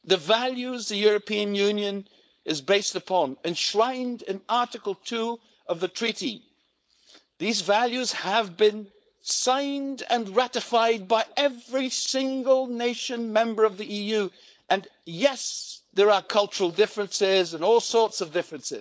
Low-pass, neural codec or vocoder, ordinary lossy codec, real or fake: none; codec, 16 kHz, 4.8 kbps, FACodec; none; fake